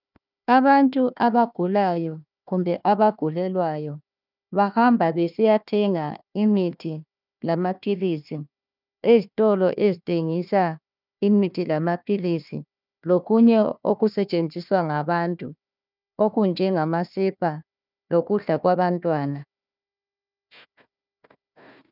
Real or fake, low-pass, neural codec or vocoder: fake; 5.4 kHz; codec, 16 kHz, 1 kbps, FunCodec, trained on Chinese and English, 50 frames a second